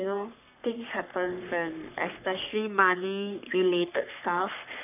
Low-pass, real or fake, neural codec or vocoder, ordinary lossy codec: 3.6 kHz; fake; codec, 44.1 kHz, 3.4 kbps, Pupu-Codec; none